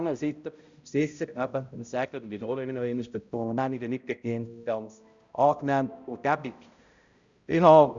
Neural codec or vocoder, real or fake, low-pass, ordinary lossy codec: codec, 16 kHz, 0.5 kbps, X-Codec, HuBERT features, trained on balanced general audio; fake; 7.2 kHz; Opus, 64 kbps